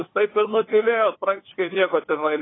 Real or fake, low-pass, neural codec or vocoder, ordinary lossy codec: fake; 7.2 kHz; codec, 16 kHz, 8 kbps, FunCodec, trained on LibriTTS, 25 frames a second; AAC, 16 kbps